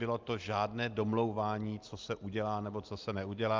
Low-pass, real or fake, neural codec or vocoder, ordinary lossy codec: 7.2 kHz; real; none; Opus, 32 kbps